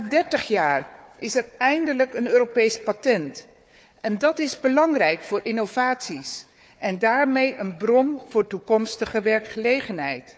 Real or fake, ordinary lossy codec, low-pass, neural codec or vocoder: fake; none; none; codec, 16 kHz, 4 kbps, FunCodec, trained on Chinese and English, 50 frames a second